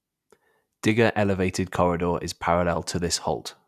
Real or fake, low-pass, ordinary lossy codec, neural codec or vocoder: real; 14.4 kHz; none; none